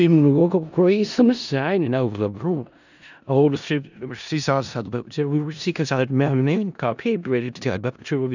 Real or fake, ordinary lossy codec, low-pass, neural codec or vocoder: fake; none; 7.2 kHz; codec, 16 kHz in and 24 kHz out, 0.4 kbps, LongCat-Audio-Codec, four codebook decoder